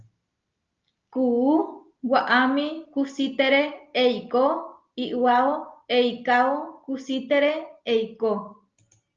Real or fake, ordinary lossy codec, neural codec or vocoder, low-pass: real; Opus, 24 kbps; none; 7.2 kHz